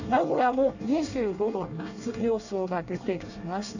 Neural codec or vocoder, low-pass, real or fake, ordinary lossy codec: codec, 24 kHz, 1 kbps, SNAC; 7.2 kHz; fake; none